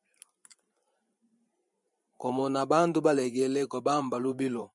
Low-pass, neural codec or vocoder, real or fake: 10.8 kHz; vocoder, 44.1 kHz, 128 mel bands every 512 samples, BigVGAN v2; fake